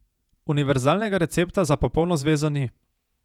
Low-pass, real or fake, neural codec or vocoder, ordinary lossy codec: 19.8 kHz; fake; vocoder, 48 kHz, 128 mel bands, Vocos; none